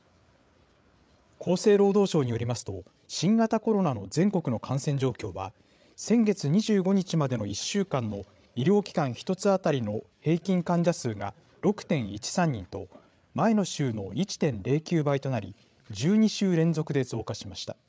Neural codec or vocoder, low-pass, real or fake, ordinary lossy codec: codec, 16 kHz, 8 kbps, FreqCodec, larger model; none; fake; none